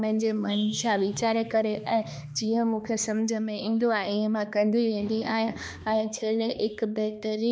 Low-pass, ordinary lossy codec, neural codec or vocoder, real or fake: none; none; codec, 16 kHz, 2 kbps, X-Codec, HuBERT features, trained on balanced general audio; fake